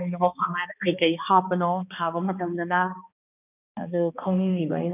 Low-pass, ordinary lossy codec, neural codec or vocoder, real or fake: 3.6 kHz; none; codec, 16 kHz, 2 kbps, X-Codec, HuBERT features, trained on balanced general audio; fake